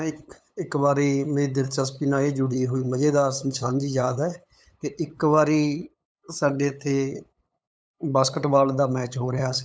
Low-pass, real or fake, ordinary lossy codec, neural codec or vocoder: none; fake; none; codec, 16 kHz, 8 kbps, FunCodec, trained on LibriTTS, 25 frames a second